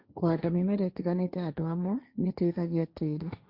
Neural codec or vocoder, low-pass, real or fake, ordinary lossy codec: codec, 16 kHz, 1.1 kbps, Voila-Tokenizer; 5.4 kHz; fake; Opus, 64 kbps